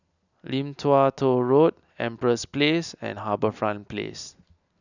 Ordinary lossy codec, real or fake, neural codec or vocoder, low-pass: none; real; none; 7.2 kHz